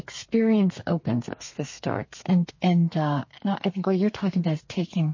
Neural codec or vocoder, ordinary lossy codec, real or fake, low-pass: codec, 32 kHz, 1.9 kbps, SNAC; MP3, 32 kbps; fake; 7.2 kHz